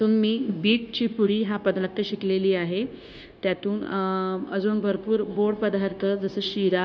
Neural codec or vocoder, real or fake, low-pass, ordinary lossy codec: codec, 16 kHz, 0.9 kbps, LongCat-Audio-Codec; fake; none; none